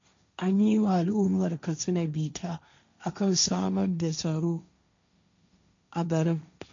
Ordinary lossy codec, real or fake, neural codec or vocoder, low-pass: MP3, 64 kbps; fake; codec, 16 kHz, 1.1 kbps, Voila-Tokenizer; 7.2 kHz